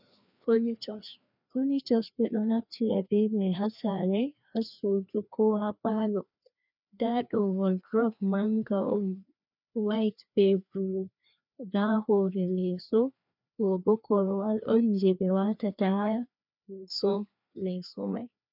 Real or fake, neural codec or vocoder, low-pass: fake; codec, 16 kHz, 2 kbps, FreqCodec, larger model; 5.4 kHz